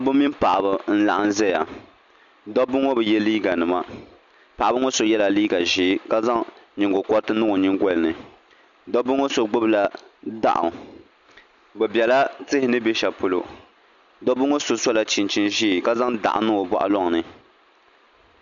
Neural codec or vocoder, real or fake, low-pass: none; real; 7.2 kHz